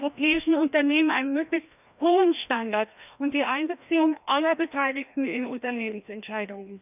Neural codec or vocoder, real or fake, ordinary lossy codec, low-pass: codec, 16 kHz, 1 kbps, FreqCodec, larger model; fake; none; 3.6 kHz